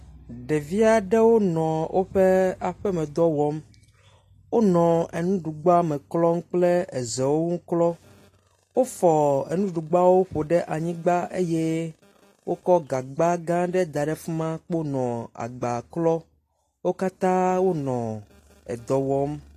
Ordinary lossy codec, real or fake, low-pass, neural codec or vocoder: AAC, 48 kbps; real; 14.4 kHz; none